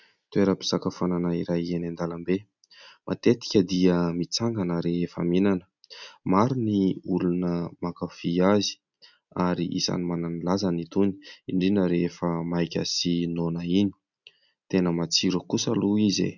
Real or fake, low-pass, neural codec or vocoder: real; 7.2 kHz; none